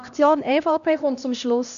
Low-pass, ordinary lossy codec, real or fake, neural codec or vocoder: 7.2 kHz; none; fake; codec, 16 kHz, 1 kbps, X-Codec, HuBERT features, trained on LibriSpeech